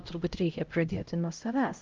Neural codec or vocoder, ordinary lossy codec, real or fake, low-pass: codec, 16 kHz, 0.5 kbps, X-Codec, HuBERT features, trained on LibriSpeech; Opus, 24 kbps; fake; 7.2 kHz